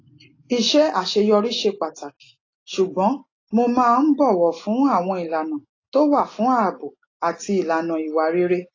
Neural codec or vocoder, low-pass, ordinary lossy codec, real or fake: none; 7.2 kHz; AAC, 32 kbps; real